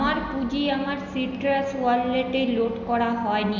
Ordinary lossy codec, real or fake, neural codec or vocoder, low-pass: none; real; none; 7.2 kHz